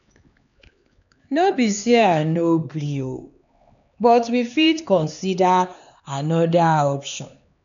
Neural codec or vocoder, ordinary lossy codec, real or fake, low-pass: codec, 16 kHz, 4 kbps, X-Codec, HuBERT features, trained on LibriSpeech; none; fake; 7.2 kHz